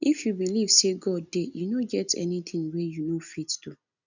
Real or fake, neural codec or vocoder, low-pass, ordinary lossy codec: real; none; 7.2 kHz; none